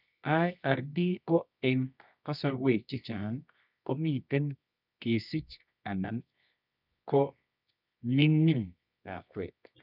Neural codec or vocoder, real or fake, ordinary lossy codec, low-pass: codec, 24 kHz, 0.9 kbps, WavTokenizer, medium music audio release; fake; none; 5.4 kHz